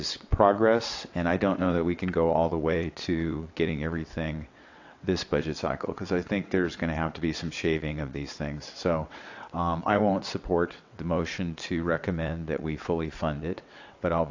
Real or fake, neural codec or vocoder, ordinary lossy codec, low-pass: fake; vocoder, 22.05 kHz, 80 mel bands, WaveNeXt; AAC, 48 kbps; 7.2 kHz